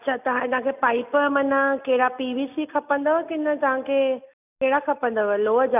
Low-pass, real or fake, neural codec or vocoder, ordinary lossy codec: 3.6 kHz; real; none; none